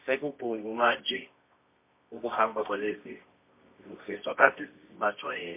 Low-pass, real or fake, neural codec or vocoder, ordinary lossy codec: 3.6 kHz; fake; codec, 24 kHz, 0.9 kbps, WavTokenizer, medium music audio release; MP3, 24 kbps